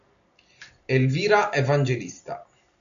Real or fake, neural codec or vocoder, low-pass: real; none; 7.2 kHz